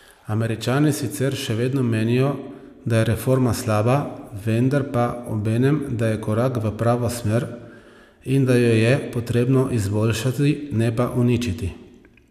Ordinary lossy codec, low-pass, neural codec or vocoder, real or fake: none; 14.4 kHz; none; real